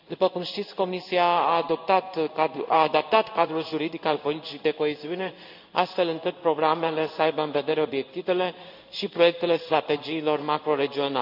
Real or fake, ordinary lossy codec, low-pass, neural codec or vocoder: fake; none; 5.4 kHz; codec, 16 kHz in and 24 kHz out, 1 kbps, XY-Tokenizer